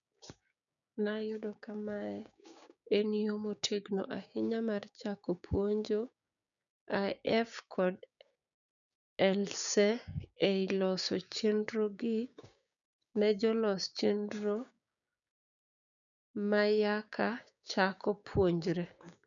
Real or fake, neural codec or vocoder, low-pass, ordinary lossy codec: fake; codec, 16 kHz, 6 kbps, DAC; 7.2 kHz; none